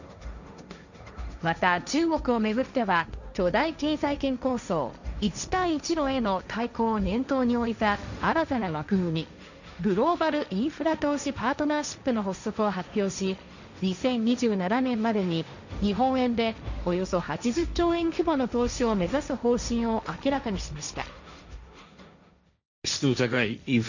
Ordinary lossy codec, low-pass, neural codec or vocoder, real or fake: none; 7.2 kHz; codec, 16 kHz, 1.1 kbps, Voila-Tokenizer; fake